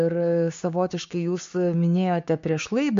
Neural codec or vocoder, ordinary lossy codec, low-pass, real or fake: codec, 16 kHz, 6 kbps, DAC; MP3, 48 kbps; 7.2 kHz; fake